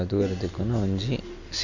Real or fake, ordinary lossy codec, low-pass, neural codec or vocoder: real; none; 7.2 kHz; none